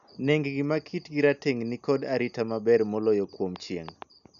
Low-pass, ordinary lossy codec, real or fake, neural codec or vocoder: 7.2 kHz; none; real; none